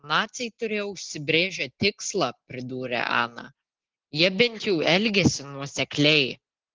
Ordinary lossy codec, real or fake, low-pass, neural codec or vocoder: Opus, 16 kbps; real; 7.2 kHz; none